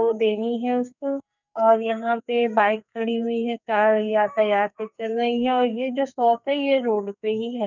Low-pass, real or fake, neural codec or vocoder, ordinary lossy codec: 7.2 kHz; fake; codec, 44.1 kHz, 2.6 kbps, SNAC; none